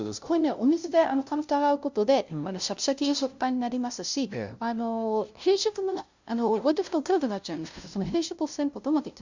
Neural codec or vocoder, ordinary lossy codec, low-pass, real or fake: codec, 16 kHz, 0.5 kbps, FunCodec, trained on LibriTTS, 25 frames a second; Opus, 64 kbps; 7.2 kHz; fake